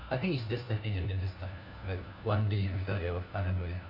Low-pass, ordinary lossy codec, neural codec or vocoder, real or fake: 5.4 kHz; none; codec, 16 kHz, 1 kbps, FunCodec, trained on LibriTTS, 50 frames a second; fake